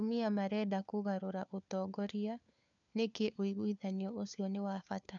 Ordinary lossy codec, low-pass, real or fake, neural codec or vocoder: none; 7.2 kHz; fake; codec, 16 kHz, 4 kbps, FunCodec, trained on LibriTTS, 50 frames a second